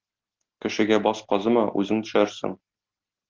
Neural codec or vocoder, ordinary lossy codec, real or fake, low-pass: none; Opus, 16 kbps; real; 7.2 kHz